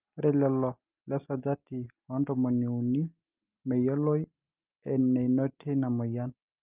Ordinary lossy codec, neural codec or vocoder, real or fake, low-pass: Opus, 24 kbps; none; real; 3.6 kHz